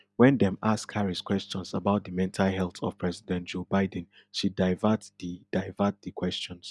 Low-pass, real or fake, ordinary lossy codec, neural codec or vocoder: none; real; none; none